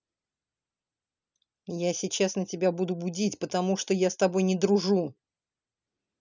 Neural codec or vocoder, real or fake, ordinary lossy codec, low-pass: none; real; none; 7.2 kHz